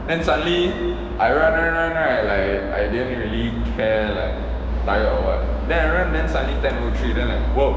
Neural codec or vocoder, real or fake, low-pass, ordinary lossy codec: codec, 16 kHz, 6 kbps, DAC; fake; none; none